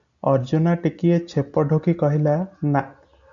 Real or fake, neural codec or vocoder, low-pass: real; none; 7.2 kHz